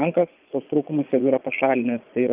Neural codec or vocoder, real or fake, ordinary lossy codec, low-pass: vocoder, 22.05 kHz, 80 mel bands, Vocos; fake; Opus, 24 kbps; 3.6 kHz